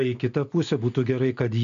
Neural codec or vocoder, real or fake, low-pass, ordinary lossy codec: none; real; 7.2 kHz; MP3, 96 kbps